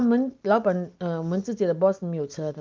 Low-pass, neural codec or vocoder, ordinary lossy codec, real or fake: 7.2 kHz; none; Opus, 24 kbps; real